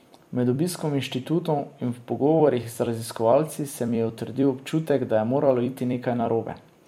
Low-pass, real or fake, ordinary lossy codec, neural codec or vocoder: 19.8 kHz; fake; MP3, 64 kbps; vocoder, 44.1 kHz, 128 mel bands every 256 samples, BigVGAN v2